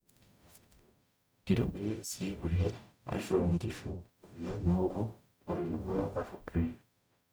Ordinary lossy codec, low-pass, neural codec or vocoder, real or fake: none; none; codec, 44.1 kHz, 0.9 kbps, DAC; fake